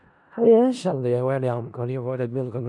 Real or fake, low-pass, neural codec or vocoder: fake; 10.8 kHz; codec, 16 kHz in and 24 kHz out, 0.4 kbps, LongCat-Audio-Codec, four codebook decoder